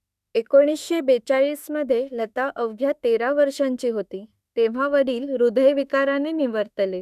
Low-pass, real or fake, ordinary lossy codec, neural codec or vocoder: 14.4 kHz; fake; none; autoencoder, 48 kHz, 32 numbers a frame, DAC-VAE, trained on Japanese speech